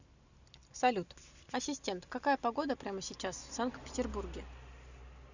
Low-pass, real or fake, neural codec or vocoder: 7.2 kHz; real; none